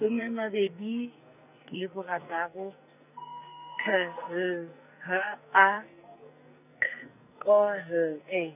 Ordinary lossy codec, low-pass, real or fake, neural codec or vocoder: none; 3.6 kHz; fake; codec, 44.1 kHz, 2.6 kbps, SNAC